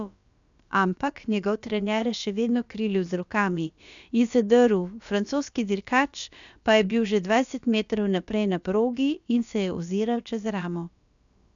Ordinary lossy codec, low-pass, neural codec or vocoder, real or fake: none; 7.2 kHz; codec, 16 kHz, about 1 kbps, DyCAST, with the encoder's durations; fake